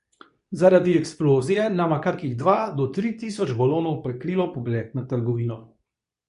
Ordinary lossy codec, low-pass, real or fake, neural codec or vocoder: none; 10.8 kHz; fake; codec, 24 kHz, 0.9 kbps, WavTokenizer, medium speech release version 2